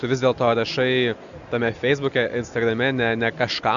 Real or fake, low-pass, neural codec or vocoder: real; 7.2 kHz; none